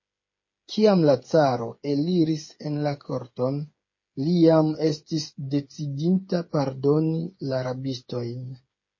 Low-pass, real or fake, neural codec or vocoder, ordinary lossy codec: 7.2 kHz; fake; codec, 16 kHz, 8 kbps, FreqCodec, smaller model; MP3, 32 kbps